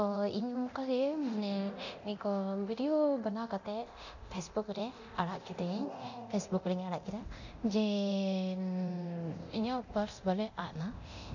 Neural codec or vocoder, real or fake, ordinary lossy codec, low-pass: codec, 24 kHz, 0.9 kbps, DualCodec; fake; none; 7.2 kHz